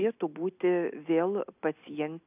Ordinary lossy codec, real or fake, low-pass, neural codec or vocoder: AAC, 32 kbps; real; 3.6 kHz; none